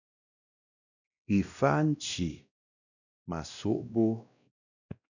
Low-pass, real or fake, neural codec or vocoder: 7.2 kHz; fake; codec, 16 kHz, 1 kbps, X-Codec, WavLM features, trained on Multilingual LibriSpeech